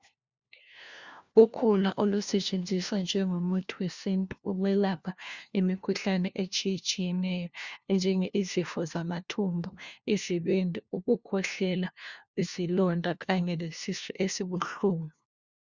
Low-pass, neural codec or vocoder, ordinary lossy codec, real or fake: 7.2 kHz; codec, 16 kHz, 1 kbps, FunCodec, trained on LibriTTS, 50 frames a second; Opus, 64 kbps; fake